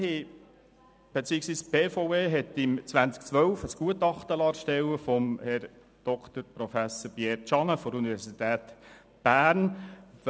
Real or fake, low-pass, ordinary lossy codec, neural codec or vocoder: real; none; none; none